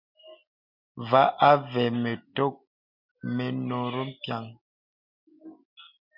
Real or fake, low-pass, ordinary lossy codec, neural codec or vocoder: real; 5.4 kHz; MP3, 48 kbps; none